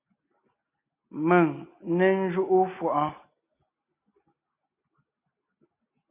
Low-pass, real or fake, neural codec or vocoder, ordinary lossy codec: 3.6 kHz; real; none; MP3, 32 kbps